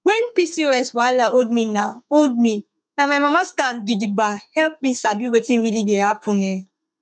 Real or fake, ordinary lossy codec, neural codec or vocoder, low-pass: fake; none; codec, 32 kHz, 1.9 kbps, SNAC; 9.9 kHz